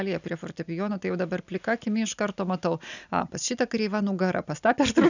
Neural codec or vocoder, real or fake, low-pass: none; real; 7.2 kHz